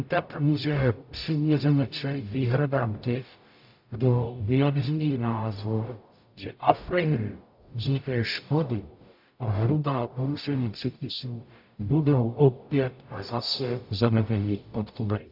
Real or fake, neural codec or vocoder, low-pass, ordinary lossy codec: fake; codec, 44.1 kHz, 0.9 kbps, DAC; 5.4 kHz; AAC, 48 kbps